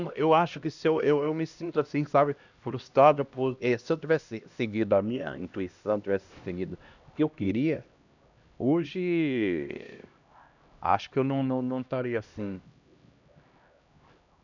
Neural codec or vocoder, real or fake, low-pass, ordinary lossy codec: codec, 16 kHz, 1 kbps, X-Codec, HuBERT features, trained on LibriSpeech; fake; 7.2 kHz; none